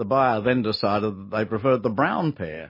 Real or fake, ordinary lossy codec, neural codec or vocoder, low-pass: real; MP3, 24 kbps; none; 5.4 kHz